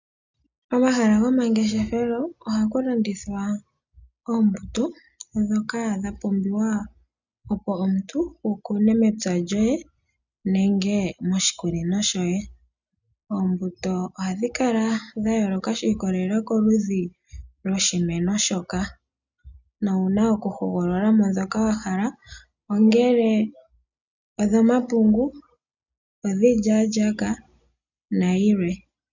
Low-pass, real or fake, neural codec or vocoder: 7.2 kHz; real; none